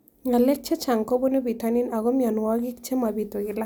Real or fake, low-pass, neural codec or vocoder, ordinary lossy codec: real; none; none; none